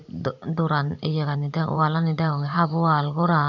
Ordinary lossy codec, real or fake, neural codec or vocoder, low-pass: none; fake; vocoder, 44.1 kHz, 128 mel bands every 512 samples, BigVGAN v2; 7.2 kHz